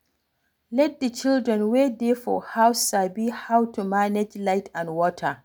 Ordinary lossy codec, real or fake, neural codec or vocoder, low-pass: none; real; none; none